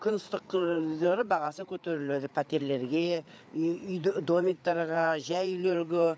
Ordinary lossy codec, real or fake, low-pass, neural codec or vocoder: none; fake; none; codec, 16 kHz, 4 kbps, FreqCodec, larger model